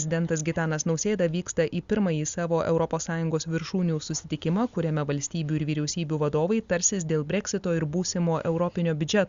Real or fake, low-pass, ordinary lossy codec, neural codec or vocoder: real; 7.2 kHz; Opus, 64 kbps; none